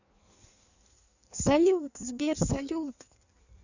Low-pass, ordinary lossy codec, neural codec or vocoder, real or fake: 7.2 kHz; none; codec, 16 kHz in and 24 kHz out, 1.1 kbps, FireRedTTS-2 codec; fake